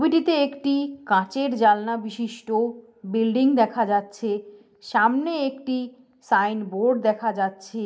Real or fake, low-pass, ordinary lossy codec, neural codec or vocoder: real; none; none; none